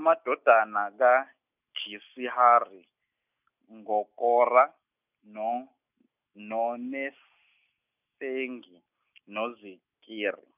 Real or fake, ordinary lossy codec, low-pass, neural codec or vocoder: real; none; 3.6 kHz; none